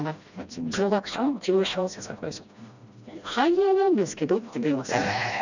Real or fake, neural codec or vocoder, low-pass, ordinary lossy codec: fake; codec, 16 kHz, 1 kbps, FreqCodec, smaller model; 7.2 kHz; none